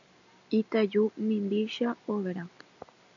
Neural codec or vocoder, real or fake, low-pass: none; real; 7.2 kHz